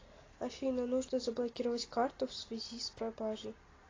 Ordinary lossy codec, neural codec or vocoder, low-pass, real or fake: AAC, 32 kbps; none; 7.2 kHz; real